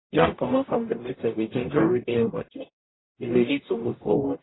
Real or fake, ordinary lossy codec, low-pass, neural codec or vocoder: fake; AAC, 16 kbps; 7.2 kHz; codec, 44.1 kHz, 0.9 kbps, DAC